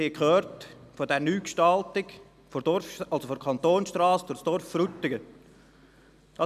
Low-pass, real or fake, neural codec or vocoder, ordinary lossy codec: 14.4 kHz; real; none; none